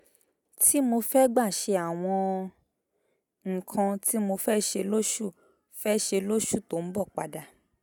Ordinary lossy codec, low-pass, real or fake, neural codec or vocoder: none; none; real; none